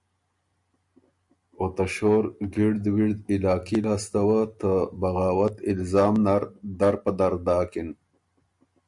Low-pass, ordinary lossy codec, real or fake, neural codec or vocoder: 10.8 kHz; Opus, 64 kbps; real; none